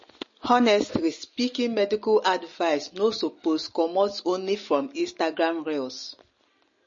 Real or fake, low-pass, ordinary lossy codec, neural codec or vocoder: real; 7.2 kHz; MP3, 32 kbps; none